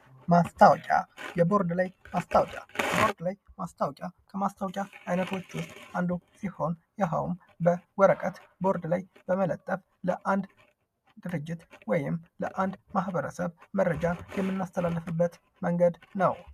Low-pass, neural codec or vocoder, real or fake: 14.4 kHz; none; real